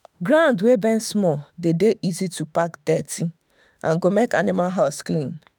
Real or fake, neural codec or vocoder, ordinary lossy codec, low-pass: fake; autoencoder, 48 kHz, 32 numbers a frame, DAC-VAE, trained on Japanese speech; none; none